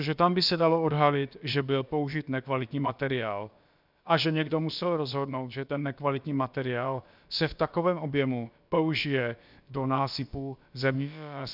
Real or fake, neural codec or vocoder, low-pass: fake; codec, 16 kHz, about 1 kbps, DyCAST, with the encoder's durations; 5.4 kHz